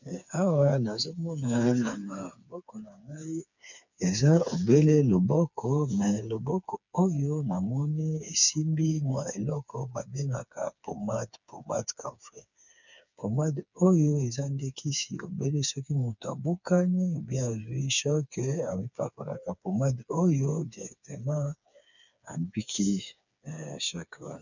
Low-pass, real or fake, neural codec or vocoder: 7.2 kHz; fake; codec, 16 kHz, 4 kbps, FreqCodec, smaller model